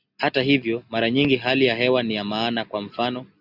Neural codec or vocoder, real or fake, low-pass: none; real; 5.4 kHz